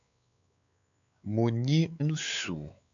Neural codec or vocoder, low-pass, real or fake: codec, 16 kHz, 4 kbps, X-Codec, WavLM features, trained on Multilingual LibriSpeech; 7.2 kHz; fake